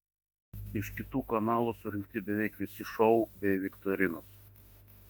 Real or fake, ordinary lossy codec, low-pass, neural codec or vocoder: fake; MP3, 96 kbps; 19.8 kHz; autoencoder, 48 kHz, 32 numbers a frame, DAC-VAE, trained on Japanese speech